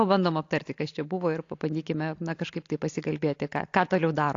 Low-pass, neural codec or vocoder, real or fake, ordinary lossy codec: 7.2 kHz; none; real; AAC, 48 kbps